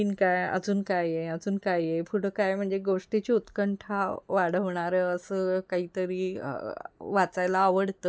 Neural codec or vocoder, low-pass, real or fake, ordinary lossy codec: none; none; real; none